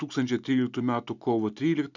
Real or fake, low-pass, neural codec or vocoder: real; 7.2 kHz; none